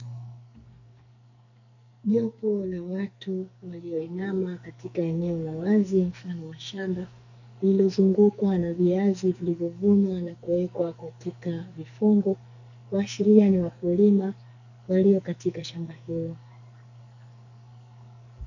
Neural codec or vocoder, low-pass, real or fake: codec, 44.1 kHz, 2.6 kbps, SNAC; 7.2 kHz; fake